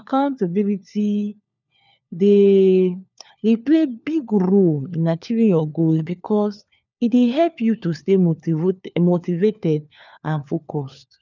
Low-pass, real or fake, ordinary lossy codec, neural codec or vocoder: 7.2 kHz; fake; none; codec, 16 kHz, 4 kbps, FunCodec, trained on LibriTTS, 50 frames a second